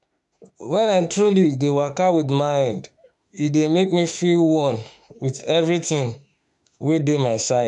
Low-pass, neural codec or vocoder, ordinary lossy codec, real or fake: 10.8 kHz; autoencoder, 48 kHz, 32 numbers a frame, DAC-VAE, trained on Japanese speech; none; fake